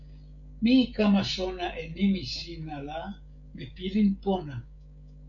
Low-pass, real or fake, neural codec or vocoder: 7.2 kHz; fake; codec, 16 kHz, 16 kbps, FreqCodec, smaller model